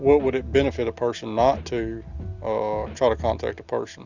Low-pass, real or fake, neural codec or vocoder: 7.2 kHz; real; none